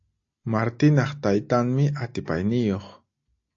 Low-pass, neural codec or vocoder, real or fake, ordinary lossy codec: 7.2 kHz; none; real; AAC, 48 kbps